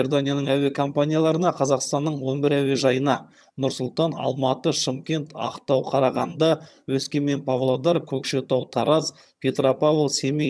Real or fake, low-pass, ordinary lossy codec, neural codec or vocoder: fake; none; none; vocoder, 22.05 kHz, 80 mel bands, HiFi-GAN